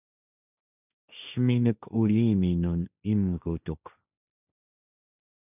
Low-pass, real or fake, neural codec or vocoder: 3.6 kHz; fake; codec, 16 kHz, 1.1 kbps, Voila-Tokenizer